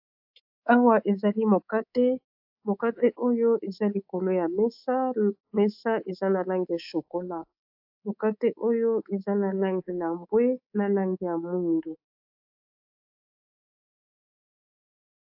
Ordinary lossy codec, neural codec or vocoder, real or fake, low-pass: AAC, 48 kbps; codec, 24 kHz, 3.1 kbps, DualCodec; fake; 5.4 kHz